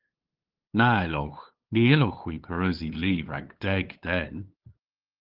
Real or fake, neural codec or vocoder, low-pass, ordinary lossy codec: fake; codec, 16 kHz, 8 kbps, FunCodec, trained on LibriTTS, 25 frames a second; 5.4 kHz; Opus, 16 kbps